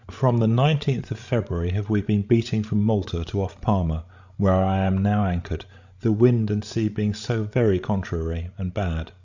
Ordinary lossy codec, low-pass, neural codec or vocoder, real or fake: Opus, 64 kbps; 7.2 kHz; codec, 16 kHz, 16 kbps, FreqCodec, larger model; fake